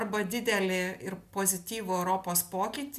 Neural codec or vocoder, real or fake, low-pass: none; real; 14.4 kHz